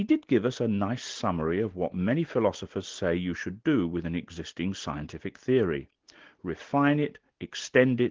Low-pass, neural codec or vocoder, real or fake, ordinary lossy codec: 7.2 kHz; none; real; Opus, 16 kbps